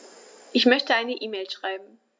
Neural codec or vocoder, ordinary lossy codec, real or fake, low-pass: none; none; real; none